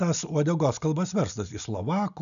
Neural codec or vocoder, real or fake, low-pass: none; real; 7.2 kHz